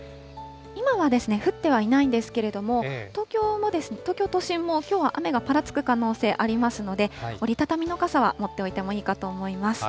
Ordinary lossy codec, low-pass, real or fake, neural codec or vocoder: none; none; real; none